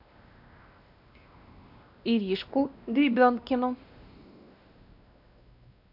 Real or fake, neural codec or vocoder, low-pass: fake; codec, 16 kHz, 1 kbps, X-Codec, WavLM features, trained on Multilingual LibriSpeech; 5.4 kHz